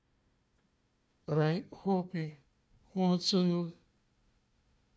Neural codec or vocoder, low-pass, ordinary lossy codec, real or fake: codec, 16 kHz, 1 kbps, FunCodec, trained on Chinese and English, 50 frames a second; none; none; fake